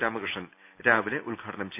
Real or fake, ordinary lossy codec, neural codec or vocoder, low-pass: real; none; none; 3.6 kHz